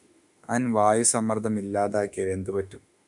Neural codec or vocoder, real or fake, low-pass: autoencoder, 48 kHz, 32 numbers a frame, DAC-VAE, trained on Japanese speech; fake; 10.8 kHz